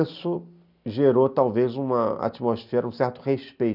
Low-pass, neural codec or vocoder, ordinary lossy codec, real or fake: 5.4 kHz; none; none; real